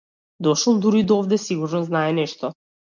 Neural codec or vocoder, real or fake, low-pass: none; real; 7.2 kHz